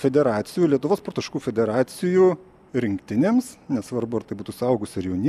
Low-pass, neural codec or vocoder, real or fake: 14.4 kHz; none; real